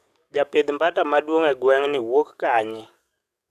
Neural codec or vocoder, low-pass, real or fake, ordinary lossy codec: codec, 44.1 kHz, 7.8 kbps, DAC; 14.4 kHz; fake; none